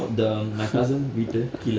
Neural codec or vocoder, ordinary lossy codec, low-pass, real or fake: none; none; none; real